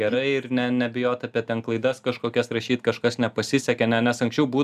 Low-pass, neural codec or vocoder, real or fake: 14.4 kHz; none; real